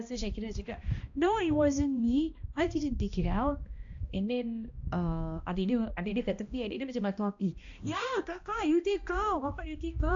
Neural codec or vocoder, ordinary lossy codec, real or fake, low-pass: codec, 16 kHz, 1 kbps, X-Codec, HuBERT features, trained on balanced general audio; AAC, 64 kbps; fake; 7.2 kHz